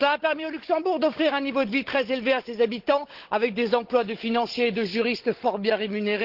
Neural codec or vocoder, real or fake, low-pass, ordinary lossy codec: codec, 16 kHz, 16 kbps, FunCodec, trained on Chinese and English, 50 frames a second; fake; 5.4 kHz; Opus, 16 kbps